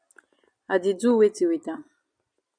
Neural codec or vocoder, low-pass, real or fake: none; 9.9 kHz; real